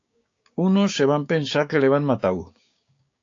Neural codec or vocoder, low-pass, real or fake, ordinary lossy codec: codec, 16 kHz, 6 kbps, DAC; 7.2 kHz; fake; AAC, 48 kbps